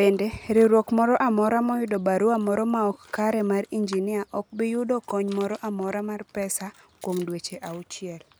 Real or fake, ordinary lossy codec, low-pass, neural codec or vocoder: real; none; none; none